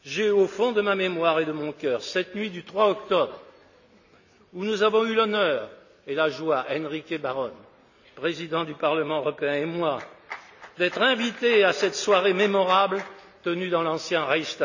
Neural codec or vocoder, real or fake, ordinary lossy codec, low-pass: none; real; none; 7.2 kHz